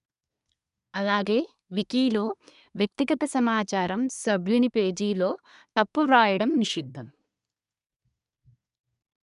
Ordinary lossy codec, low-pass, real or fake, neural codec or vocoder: none; 10.8 kHz; fake; codec, 24 kHz, 1 kbps, SNAC